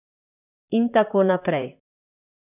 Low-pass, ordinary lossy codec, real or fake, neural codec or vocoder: 3.6 kHz; none; fake; vocoder, 44.1 kHz, 128 mel bands, Pupu-Vocoder